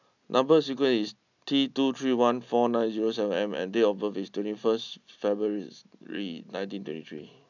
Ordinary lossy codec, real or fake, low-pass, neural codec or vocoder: none; real; 7.2 kHz; none